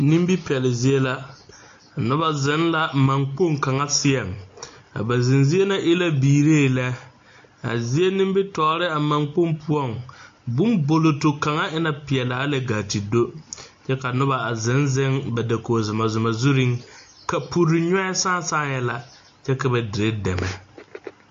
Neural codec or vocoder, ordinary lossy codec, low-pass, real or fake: none; AAC, 48 kbps; 7.2 kHz; real